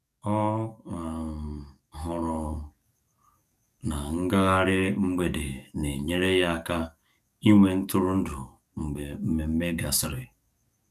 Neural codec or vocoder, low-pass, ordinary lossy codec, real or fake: codec, 44.1 kHz, 7.8 kbps, DAC; 14.4 kHz; none; fake